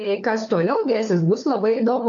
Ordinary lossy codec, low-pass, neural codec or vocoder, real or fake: AAC, 64 kbps; 7.2 kHz; codec, 16 kHz, 4 kbps, FunCodec, trained on LibriTTS, 50 frames a second; fake